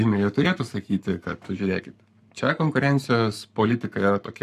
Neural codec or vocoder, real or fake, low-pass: codec, 44.1 kHz, 7.8 kbps, Pupu-Codec; fake; 14.4 kHz